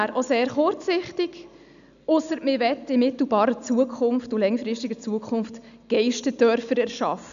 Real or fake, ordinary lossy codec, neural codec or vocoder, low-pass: real; none; none; 7.2 kHz